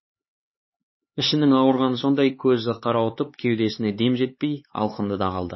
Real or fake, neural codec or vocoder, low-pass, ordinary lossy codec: fake; codec, 16 kHz, 4 kbps, X-Codec, HuBERT features, trained on LibriSpeech; 7.2 kHz; MP3, 24 kbps